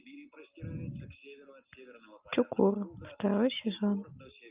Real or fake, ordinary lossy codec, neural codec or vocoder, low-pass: real; Opus, 32 kbps; none; 3.6 kHz